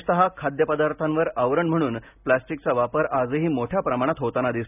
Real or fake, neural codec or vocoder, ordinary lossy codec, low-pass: real; none; none; 3.6 kHz